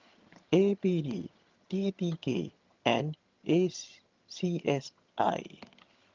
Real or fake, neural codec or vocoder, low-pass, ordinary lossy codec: fake; vocoder, 22.05 kHz, 80 mel bands, HiFi-GAN; 7.2 kHz; Opus, 32 kbps